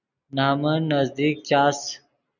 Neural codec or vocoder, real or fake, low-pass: none; real; 7.2 kHz